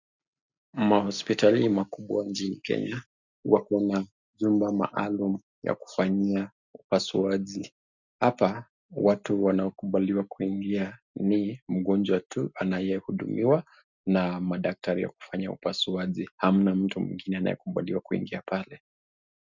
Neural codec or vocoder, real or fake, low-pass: none; real; 7.2 kHz